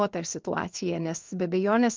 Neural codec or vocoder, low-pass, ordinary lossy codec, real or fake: codec, 16 kHz in and 24 kHz out, 1 kbps, XY-Tokenizer; 7.2 kHz; Opus, 24 kbps; fake